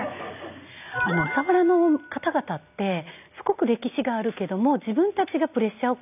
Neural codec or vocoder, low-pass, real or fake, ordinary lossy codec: none; 3.6 kHz; real; none